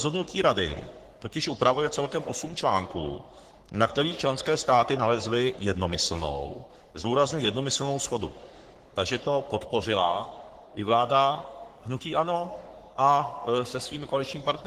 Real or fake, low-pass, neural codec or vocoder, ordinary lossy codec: fake; 14.4 kHz; codec, 44.1 kHz, 3.4 kbps, Pupu-Codec; Opus, 16 kbps